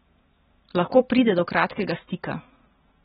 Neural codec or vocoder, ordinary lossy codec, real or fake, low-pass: none; AAC, 16 kbps; real; 7.2 kHz